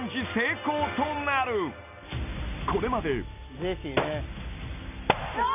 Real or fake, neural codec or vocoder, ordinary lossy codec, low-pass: real; none; none; 3.6 kHz